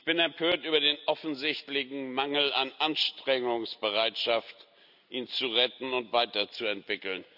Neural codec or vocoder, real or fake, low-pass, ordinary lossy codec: none; real; 5.4 kHz; none